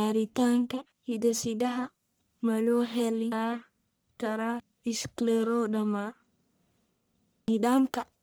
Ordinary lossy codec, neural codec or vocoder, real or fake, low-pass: none; codec, 44.1 kHz, 1.7 kbps, Pupu-Codec; fake; none